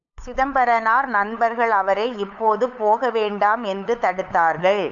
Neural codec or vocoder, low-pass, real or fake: codec, 16 kHz, 8 kbps, FunCodec, trained on LibriTTS, 25 frames a second; 7.2 kHz; fake